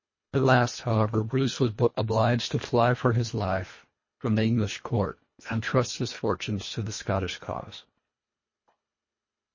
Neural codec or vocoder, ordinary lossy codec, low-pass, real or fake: codec, 24 kHz, 1.5 kbps, HILCodec; MP3, 32 kbps; 7.2 kHz; fake